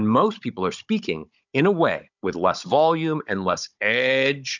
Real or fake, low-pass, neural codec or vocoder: fake; 7.2 kHz; codec, 16 kHz, 16 kbps, FunCodec, trained on Chinese and English, 50 frames a second